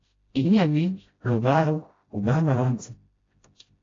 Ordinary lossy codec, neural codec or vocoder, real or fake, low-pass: AAC, 32 kbps; codec, 16 kHz, 0.5 kbps, FreqCodec, smaller model; fake; 7.2 kHz